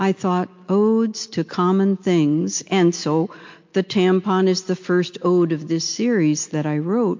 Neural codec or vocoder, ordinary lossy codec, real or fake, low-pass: none; MP3, 48 kbps; real; 7.2 kHz